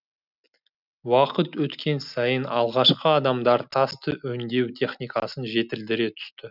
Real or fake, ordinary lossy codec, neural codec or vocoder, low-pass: real; none; none; 5.4 kHz